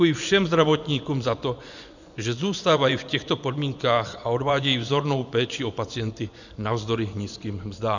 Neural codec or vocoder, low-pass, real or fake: vocoder, 24 kHz, 100 mel bands, Vocos; 7.2 kHz; fake